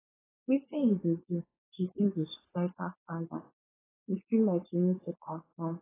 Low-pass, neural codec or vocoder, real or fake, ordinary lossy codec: 3.6 kHz; codec, 16 kHz, 4.8 kbps, FACodec; fake; AAC, 16 kbps